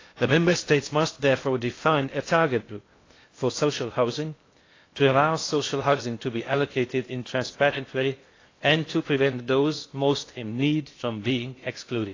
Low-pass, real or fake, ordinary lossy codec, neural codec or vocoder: 7.2 kHz; fake; AAC, 32 kbps; codec, 16 kHz in and 24 kHz out, 0.6 kbps, FocalCodec, streaming, 2048 codes